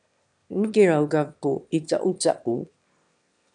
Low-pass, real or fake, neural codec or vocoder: 9.9 kHz; fake; autoencoder, 22.05 kHz, a latent of 192 numbers a frame, VITS, trained on one speaker